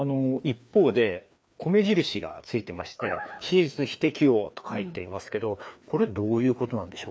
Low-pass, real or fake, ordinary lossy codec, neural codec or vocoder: none; fake; none; codec, 16 kHz, 2 kbps, FreqCodec, larger model